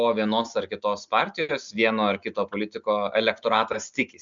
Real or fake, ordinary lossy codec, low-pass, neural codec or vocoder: real; Opus, 64 kbps; 7.2 kHz; none